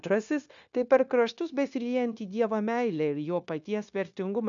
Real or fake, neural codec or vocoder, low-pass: fake; codec, 16 kHz, 0.9 kbps, LongCat-Audio-Codec; 7.2 kHz